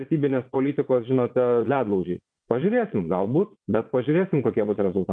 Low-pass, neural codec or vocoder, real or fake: 9.9 kHz; vocoder, 22.05 kHz, 80 mel bands, Vocos; fake